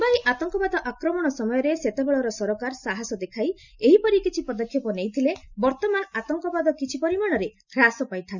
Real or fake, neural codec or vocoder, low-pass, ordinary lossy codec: real; none; 7.2 kHz; none